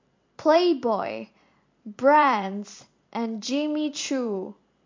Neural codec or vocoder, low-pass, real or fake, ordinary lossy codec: vocoder, 44.1 kHz, 128 mel bands every 512 samples, BigVGAN v2; 7.2 kHz; fake; MP3, 48 kbps